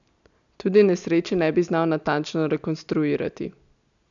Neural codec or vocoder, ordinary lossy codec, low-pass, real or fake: none; none; 7.2 kHz; real